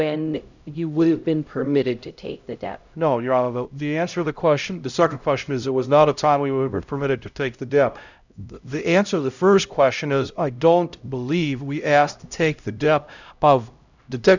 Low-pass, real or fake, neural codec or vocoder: 7.2 kHz; fake; codec, 16 kHz, 0.5 kbps, X-Codec, HuBERT features, trained on LibriSpeech